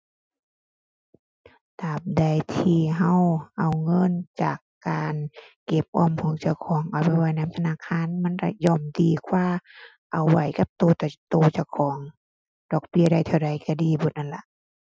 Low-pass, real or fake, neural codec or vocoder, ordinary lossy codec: none; real; none; none